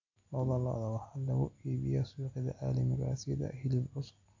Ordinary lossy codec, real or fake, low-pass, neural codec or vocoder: MP3, 48 kbps; real; 7.2 kHz; none